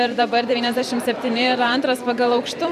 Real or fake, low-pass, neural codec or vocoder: fake; 14.4 kHz; vocoder, 44.1 kHz, 128 mel bands every 512 samples, BigVGAN v2